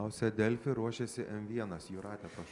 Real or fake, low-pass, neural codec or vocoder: real; 10.8 kHz; none